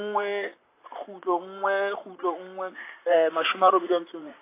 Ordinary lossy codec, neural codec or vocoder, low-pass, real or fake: AAC, 24 kbps; vocoder, 44.1 kHz, 128 mel bands, Pupu-Vocoder; 3.6 kHz; fake